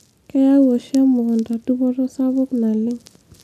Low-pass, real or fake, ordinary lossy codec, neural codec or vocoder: 14.4 kHz; real; none; none